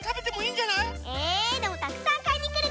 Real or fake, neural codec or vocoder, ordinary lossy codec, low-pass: real; none; none; none